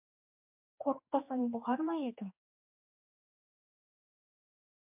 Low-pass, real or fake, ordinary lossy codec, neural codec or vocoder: 3.6 kHz; fake; MP3, 32 kbps; codec, 16 kHz, 2 kbps, X-Codec, HuBERT features, trained on general audio